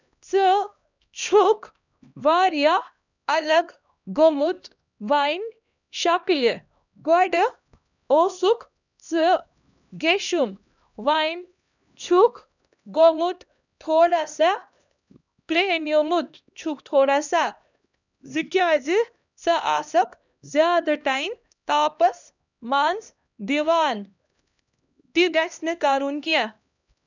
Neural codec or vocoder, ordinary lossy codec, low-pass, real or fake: codec, 16 kHz, 1 kbps, X-Codec, HuBERT features, trained on LibriSpeech; none; 7.2 kHz; fake